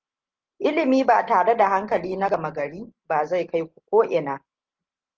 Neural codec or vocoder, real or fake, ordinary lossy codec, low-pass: none; real; Opus, 16 kbps; 7.2 kHz